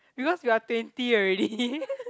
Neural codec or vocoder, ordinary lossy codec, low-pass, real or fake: none; none; none; real